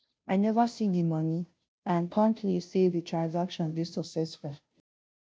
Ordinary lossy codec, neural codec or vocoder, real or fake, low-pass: none; codec, 16 kHz, 0.5 kbps, FunCodec, trained on Chinese and English, 25 frames a second; fake; none